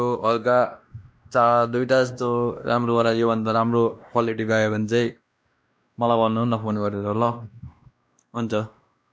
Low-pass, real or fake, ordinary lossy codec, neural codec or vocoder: none; fake; none; codec, 16 kHz, 1 kbps, X-Codec, WavLM features, trained on Multilingual LibriSpeech